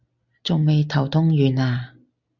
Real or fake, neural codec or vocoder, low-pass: real; none; 7.2 kHz